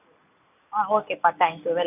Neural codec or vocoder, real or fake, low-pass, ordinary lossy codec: none; real; 3.6 kHz; none